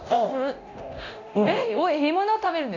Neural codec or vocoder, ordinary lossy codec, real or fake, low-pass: codec, 24 kHz, 0.9 kbps, DualCodec; none; fake; 7.2 kHz